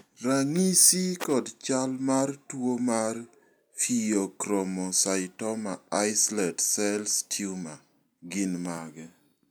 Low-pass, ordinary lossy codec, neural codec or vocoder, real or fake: none; none; none; real